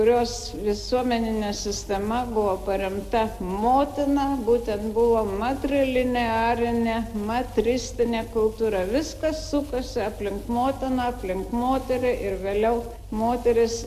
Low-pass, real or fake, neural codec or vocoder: 14.4 kHz; real; none